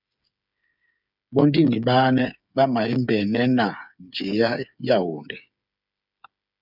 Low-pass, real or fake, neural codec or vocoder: 5.4 kHz; fake; codec, 16 kHz, 8 kbps, FreqCodec, smaller model